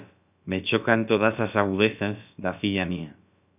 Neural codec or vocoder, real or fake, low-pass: codec, 16 kHz, about 1 kbps, DyCAST, with the encoder's durations; fake; 3.6 kHz